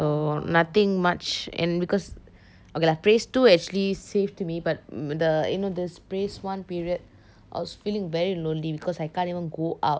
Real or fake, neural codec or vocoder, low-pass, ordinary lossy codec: real; none; none; none